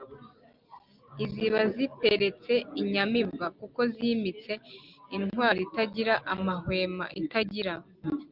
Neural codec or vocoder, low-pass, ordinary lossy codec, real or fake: none; 5.4 kHz; Opus, 24 kbps; real